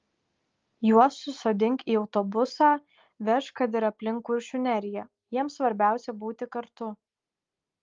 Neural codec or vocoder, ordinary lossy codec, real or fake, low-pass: none; Opus, 32 kbps; real; 7.2 kHz